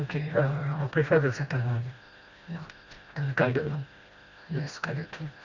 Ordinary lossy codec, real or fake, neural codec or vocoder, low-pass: Opus, 64 kbps; fake; codec, 16 kHz, 1 kbps, FreqCodec, smaller model; 7.2 kHz